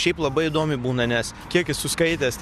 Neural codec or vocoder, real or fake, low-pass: none; real; 14.4 kHz